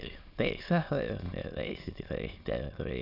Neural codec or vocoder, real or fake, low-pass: autoencoder, 22.05 kHz, a latent of 192 numbers a frame, VITS, trained on many speakers; fake; 5.4 kHz